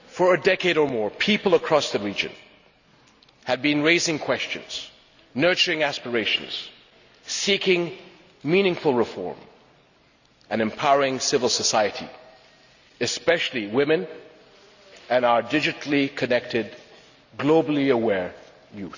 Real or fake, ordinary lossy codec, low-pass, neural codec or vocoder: real; none; 7.2 kHz; none